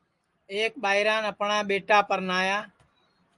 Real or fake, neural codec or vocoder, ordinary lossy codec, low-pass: real; none; Opus, 32 kbps; 10.8 kHz